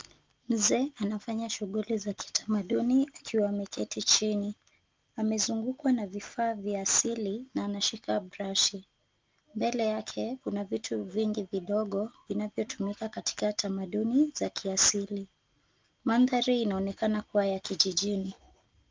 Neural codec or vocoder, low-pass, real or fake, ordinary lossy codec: none; 7.2 kHz; real; Opus, 32 kbps